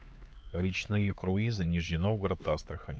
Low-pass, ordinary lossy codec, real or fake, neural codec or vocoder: none; none; fake; codec, 16 kHz, 4 kbps, X-Codec, HuBERT features, trained on LibriSpeech